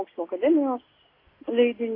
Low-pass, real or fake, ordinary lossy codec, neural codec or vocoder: 5.4 kHz; real; AAC, 24 kbps; none